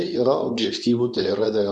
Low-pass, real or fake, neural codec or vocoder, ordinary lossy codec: 10.8 kHz; fake; codec, 24 kHz, 0.9 kbps, WavTokenizer, medium speech release version 2; MP3, 96 kbps